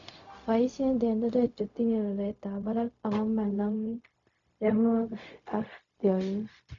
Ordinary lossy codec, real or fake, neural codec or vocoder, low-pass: none; fake; codec, 16 kHz, 0.4 kbps, LongCat-Audio-Codec; 7.2 kHz